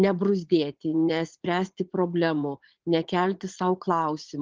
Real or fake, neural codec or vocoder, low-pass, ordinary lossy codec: fake; vocoder, 44.1 kHz, 80 mel bands, Vocos; 7.2 kHz; Opus, 16 kbps